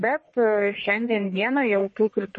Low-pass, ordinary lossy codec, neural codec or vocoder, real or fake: 10.8 kHz; MP3, 32 kbps; codec, 44.1 kHz, 1.7 kbps, Pupu-Codec; fake